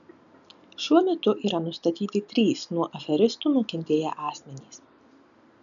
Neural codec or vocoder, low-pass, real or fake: none; 7.2 kHz; real